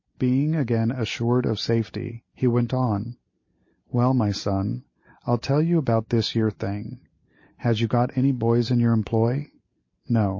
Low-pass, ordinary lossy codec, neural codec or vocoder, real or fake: 7.2 kHz; MP3, 32 kbps; none; real